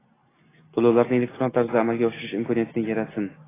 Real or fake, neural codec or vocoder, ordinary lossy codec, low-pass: real; none; AAC, 16 kbps; 3.6 kHz